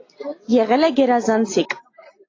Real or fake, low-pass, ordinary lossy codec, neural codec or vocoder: real; 7.2 kHz; AAC, 32 kbps; none